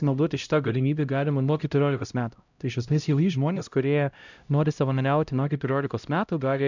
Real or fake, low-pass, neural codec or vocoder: fake; 7.2 kHz; codec, 16 kHz, 0.5 kbps, X-Codec, HuBERT features, trained on LibriSpeech